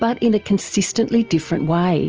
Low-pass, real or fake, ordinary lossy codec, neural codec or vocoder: 7.2 kHz; real; Opus, 24 kbps; none